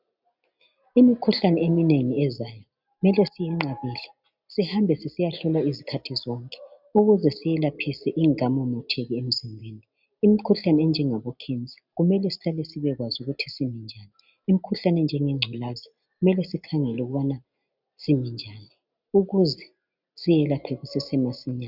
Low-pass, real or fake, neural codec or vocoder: 5.4 kHz; real; none